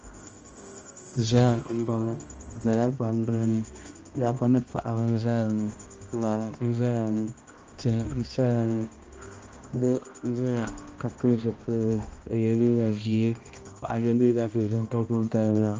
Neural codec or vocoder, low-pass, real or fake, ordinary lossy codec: codec, 16 kHz, 1 kbps, X-Codec, HuBERT features, trained on balanced general audio; 7.2 kHz; fake; Opus, 24 kbps